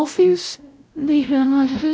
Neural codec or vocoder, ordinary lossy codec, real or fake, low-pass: codec, 16 kHz, 0.5 kbps, X-Codec, WavLM features, trained on Multilingual LibriSpeech; none; fake; none